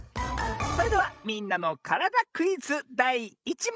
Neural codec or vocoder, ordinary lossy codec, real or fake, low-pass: codec, 16 kHz, 16 kbps, FreqCodec, larger model; none; fake; none